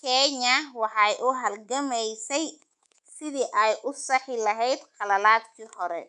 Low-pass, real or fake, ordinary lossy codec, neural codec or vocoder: 10.8 kHz; fake; none; codec, 24 kHz, 3.1 kbps, DualCodec